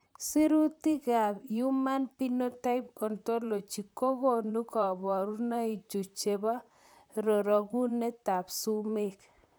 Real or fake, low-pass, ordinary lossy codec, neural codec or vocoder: fake; none; none; vocoder, 44.1 kHz, 128 mel bands, Pupu-Vocoder